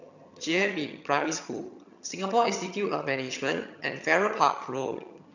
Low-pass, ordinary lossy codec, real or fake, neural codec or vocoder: 7.2 kHz; none; fake; vocoder, 22.05 kHz, 80 mel bands, HiFi-GAN